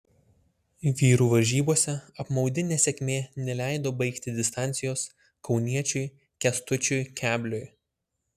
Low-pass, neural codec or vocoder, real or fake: 14.4 kHz; none; real